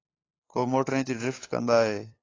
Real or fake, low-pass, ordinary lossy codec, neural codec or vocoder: fake; 7.2 kHz; AAC, 32 kbps; codec, 16 kHz, 8 kbps, FunCodec, trained on LibriTTS, 25 frames a second